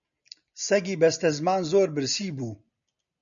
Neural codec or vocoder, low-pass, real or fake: none; 7.2 kHz; real